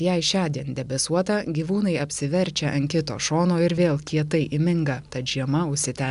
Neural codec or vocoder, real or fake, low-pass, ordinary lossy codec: none; real; 10.8 kHz; AAC, 96 kbps